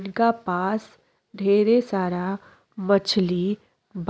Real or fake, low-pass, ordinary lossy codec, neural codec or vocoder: real; none; none; none